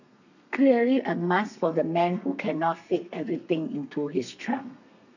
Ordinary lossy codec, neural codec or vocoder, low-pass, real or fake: none; codec, 32 kHz, 1.9 kbps, SNAC; 7.2 kHz; fake